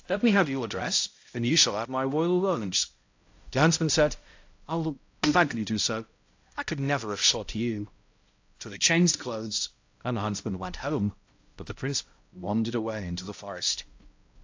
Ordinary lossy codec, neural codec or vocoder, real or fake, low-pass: AAC, 48 kbps; codec, 16 kHz, 0.5 kbps, X-Codec, HuBERT features, trained on balanced general audio; fake; 7.2 kHz